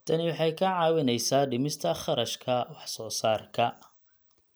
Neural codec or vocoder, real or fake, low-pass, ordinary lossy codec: none; real; none; none